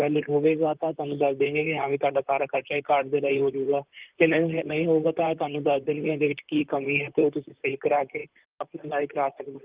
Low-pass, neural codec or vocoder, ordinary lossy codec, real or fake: 3.6 kHz; vocoder, 44.1 kHz, 128 mel bands, Pupu-Vocoder; Opus, 32 kbps; fake